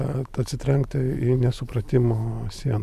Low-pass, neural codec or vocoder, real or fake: 14.4 kHz; none; real